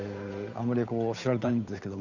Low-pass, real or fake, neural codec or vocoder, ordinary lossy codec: 7.2 kHz; fake; codec, 16 kHz, 8 kbps, FunCodec, trained on Chinese and English, 25 frames a second; none